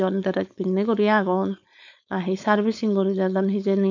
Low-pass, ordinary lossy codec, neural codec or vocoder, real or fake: 7.2 kHz; none; codec, 16 kHz, 4.8 kbps, FACodec; fake